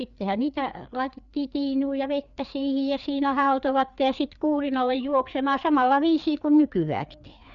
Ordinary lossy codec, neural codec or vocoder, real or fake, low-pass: none; codec, 16 kHz, 4 kbps, FreqCodec, larger model; fake; 7.2 kHz